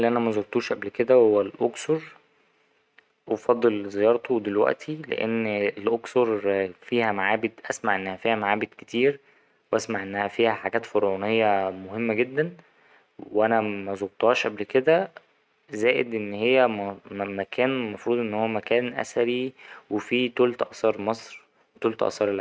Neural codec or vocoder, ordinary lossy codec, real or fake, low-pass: none; none; real; none